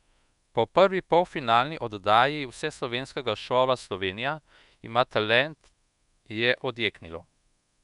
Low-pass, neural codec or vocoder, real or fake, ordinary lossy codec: 10.8 kHz; codec, 24 kHz, 1.2 kbps, DualCodec; fake; none